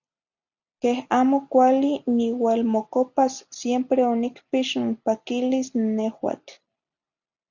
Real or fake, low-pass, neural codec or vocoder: real; 7.2 kHz; none